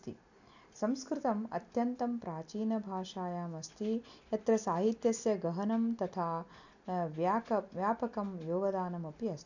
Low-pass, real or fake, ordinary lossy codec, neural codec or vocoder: 7.2 kHz; real; none; none